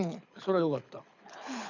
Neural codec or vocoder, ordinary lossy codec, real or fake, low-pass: codec, 24 kHz, 6 kbps, HILCodec; none; fake; 7.2 kHz